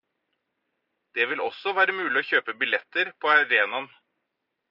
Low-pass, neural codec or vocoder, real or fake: 5.4 kHz; none; real